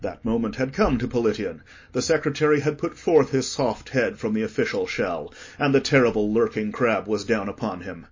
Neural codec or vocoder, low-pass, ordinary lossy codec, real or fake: none; 7.2 kHz; MP3, 32 kbps; real